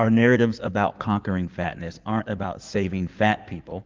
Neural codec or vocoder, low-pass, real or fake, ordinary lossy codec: codec, 16 kHz in and 24 kHz out, 2.2 kbps, FireRedTTS-2 codec; 7.2 kHz; fake; Opus, 24 kbps